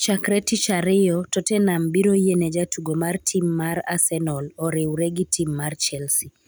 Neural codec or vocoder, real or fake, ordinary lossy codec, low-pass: none; real; none; none